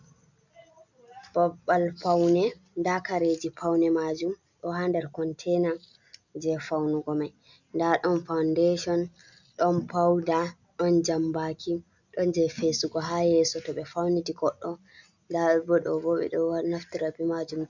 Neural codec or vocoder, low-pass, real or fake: none; 7.2 kHz; real